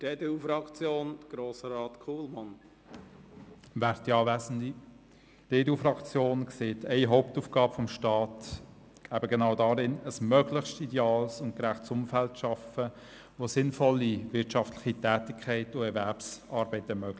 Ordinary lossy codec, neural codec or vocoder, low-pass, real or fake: none; none; none; real